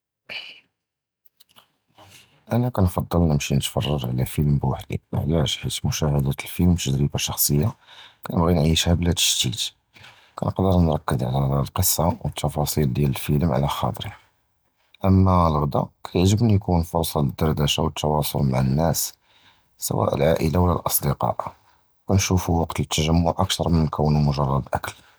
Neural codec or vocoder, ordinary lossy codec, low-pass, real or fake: none; none; none; real